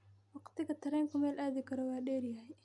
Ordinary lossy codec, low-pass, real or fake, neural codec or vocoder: none; 10.8 kHz; real; none